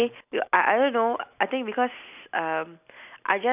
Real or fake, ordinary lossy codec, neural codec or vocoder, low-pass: real; none; none; 3.6 kHz